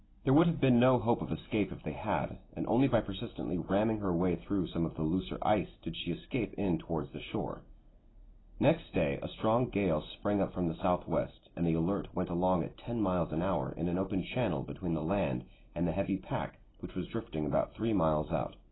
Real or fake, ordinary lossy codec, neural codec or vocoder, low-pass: real; AAC, 16 kbps; none; 7.2 kHz